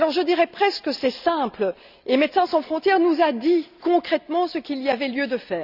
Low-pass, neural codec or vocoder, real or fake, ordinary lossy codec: 5.4 kHz; none; real; none